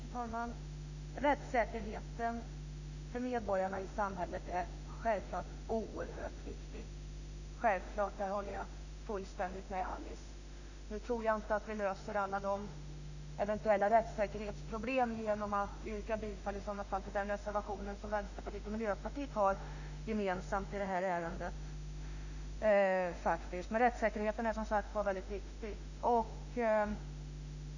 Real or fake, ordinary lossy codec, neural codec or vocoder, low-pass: fake; none; autoencoder, 48 kHz, 32 numbers a frame, DAC-VAE, trained on Japanese speech; 7.2 kHz